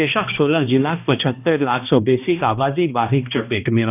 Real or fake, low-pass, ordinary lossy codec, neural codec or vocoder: fake; 3.6 kHz; none; codec, 16 kHz, 1 kbps, X-Codec, HuBERT features, trained on balanced general audio